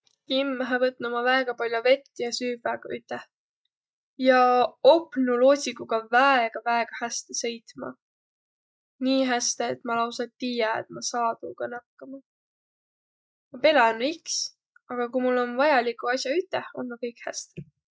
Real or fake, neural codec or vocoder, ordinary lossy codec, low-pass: real; none; none; none